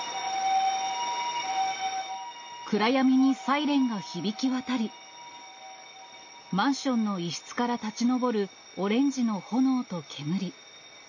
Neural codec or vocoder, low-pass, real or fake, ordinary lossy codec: none; 7.2 kHz; real; MP3, 32 kbps